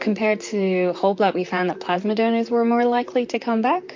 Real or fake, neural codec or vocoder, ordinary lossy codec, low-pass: fake; vocoder, 44.1 kHz, 128 mel bands, Pupu-Vocoder; MP3, 48 kbps; 7.2 kHz